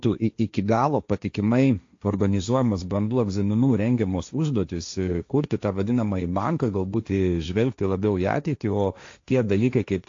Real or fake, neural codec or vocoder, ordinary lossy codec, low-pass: fake; codec, 16 kHz, 1.1 kbps, Voila-Tokenizer; AAC, 48 kbps; 7.2 kHz